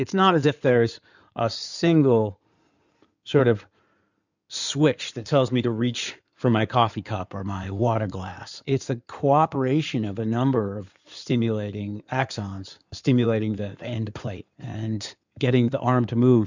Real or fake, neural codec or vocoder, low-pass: fake; codec, 16 kHz in and 24 kHz out, 2.2 kbps, FireRedTTS-2 codec; 7.2 kHz